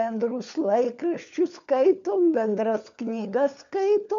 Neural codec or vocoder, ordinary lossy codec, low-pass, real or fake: codec, 16 kHz, 4 kbps, FunCodec, trained on LibriTTS, 50 frames a second; MP3, 64 kbps; 7.2 kHz; fake